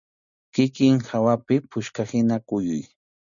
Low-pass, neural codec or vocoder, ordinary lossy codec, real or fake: 7.2 kHz; none; MP3, 96 kbps; real